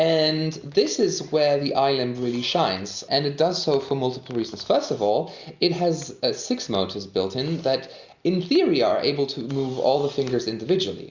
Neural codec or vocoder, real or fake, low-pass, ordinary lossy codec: none; real; 7.2 kHz; Opus, 64 kbps